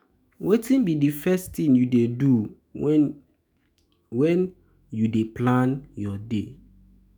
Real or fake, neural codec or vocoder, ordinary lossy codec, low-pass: fake; autoencoder, 48 kHz, 128 numbers a frame, DAC-VAE, trained on Japanese speech; none; none